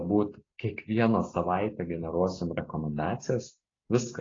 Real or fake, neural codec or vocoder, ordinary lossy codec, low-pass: real; none; AAC, 32 kbps; 7.2 kHz